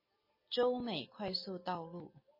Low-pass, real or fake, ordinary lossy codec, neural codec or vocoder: 5.4 kHz; real; MP3, 24 kbps; none